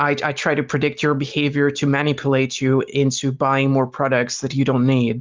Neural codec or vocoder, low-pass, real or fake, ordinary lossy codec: none; 7.2 kHz; real; Opus, 32 kbps